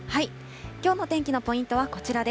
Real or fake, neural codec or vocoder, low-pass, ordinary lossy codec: real; none; none; none